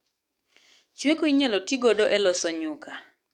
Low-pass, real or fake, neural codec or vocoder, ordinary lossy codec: 19.8 kHz; fake; codec, 44.1 kHz, 7.8 kbps, DAC; none